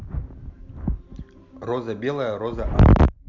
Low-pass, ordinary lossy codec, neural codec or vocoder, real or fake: 7.2 kHz; Opus, 64 kbps; none; real